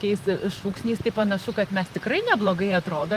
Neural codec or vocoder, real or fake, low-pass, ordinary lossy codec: vocoder, 44.1 kHz, 128 mel bands, Pupu-Vocoder; fake; 14.4 kHz; Opus, 32 kbps